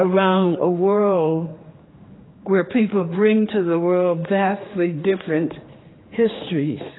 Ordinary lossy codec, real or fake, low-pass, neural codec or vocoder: AAC, 16 kbps; fake; 7.2 kHz; codec, 16 kHz, 2 kbps, X-Codec, HuBERT features, trained on general audio